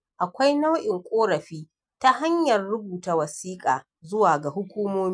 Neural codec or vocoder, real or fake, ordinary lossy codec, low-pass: none; real; none; 9.9 kHz